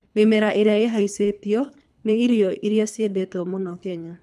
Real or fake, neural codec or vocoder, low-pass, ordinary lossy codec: fake; codec, 24 kHz, 3 kbps, HILCodec; none; none